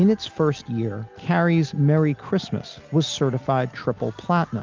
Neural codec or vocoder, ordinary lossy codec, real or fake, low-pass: none; Opus, 24 kbps; real; 7.2 kHz